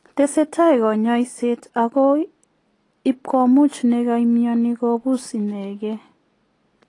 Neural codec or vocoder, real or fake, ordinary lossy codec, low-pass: none; real; AAC, 32 kbps; 10.8 kHz